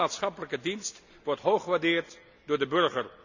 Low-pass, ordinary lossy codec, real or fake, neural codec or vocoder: 7.2 kHz; none; real; none